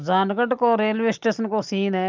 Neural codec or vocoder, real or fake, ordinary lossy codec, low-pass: none; real; Opus, 24 kbps; 7.2 kHz